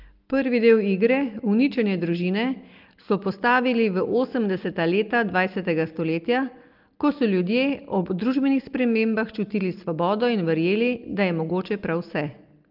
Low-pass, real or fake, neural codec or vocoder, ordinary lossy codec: 5.4 kHz; real; none; Opus, 32 kbps